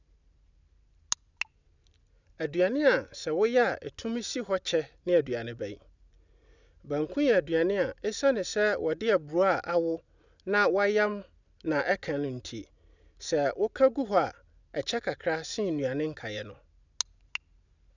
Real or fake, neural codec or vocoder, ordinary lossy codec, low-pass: real; none; none; 7.2 kHz